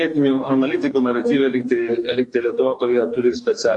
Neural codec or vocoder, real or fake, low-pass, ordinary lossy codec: codec, 44.1 kHz, 2.6 kbps, DAC; fake; 10.8 kHz; AAC, 64 kbps